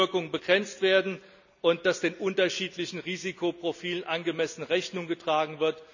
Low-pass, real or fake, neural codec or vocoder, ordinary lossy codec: 7.2 kHz; real; none; none